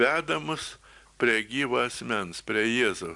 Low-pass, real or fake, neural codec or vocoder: 10.8 kHz; real; none